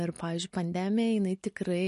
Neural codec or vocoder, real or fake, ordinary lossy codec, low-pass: none; real; MP3, 48 kbps; 14.4 kHz